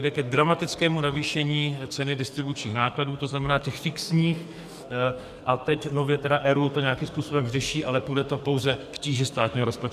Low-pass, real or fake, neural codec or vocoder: 14.4 kHz; fake; codec, 44.1 kHz, 2.6 kbps, SNAC